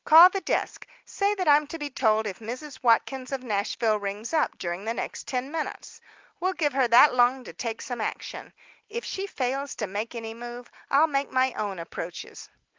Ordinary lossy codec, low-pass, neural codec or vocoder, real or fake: Opus, 32 kbps; 7.2 kHz; none; real